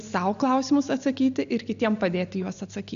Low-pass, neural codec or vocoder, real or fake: 7.2 kHz; none; real